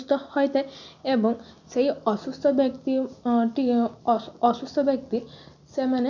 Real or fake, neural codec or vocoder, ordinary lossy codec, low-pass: real; none; none; 7.2 kHz